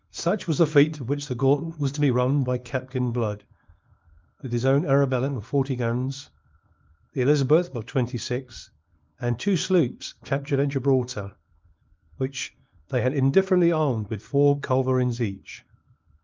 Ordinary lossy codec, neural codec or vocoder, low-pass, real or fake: Opus, 32 kbps; codec, 24 kHz, 0.9 kbps, WavTokenizer, small release; 7.2 kHz; fake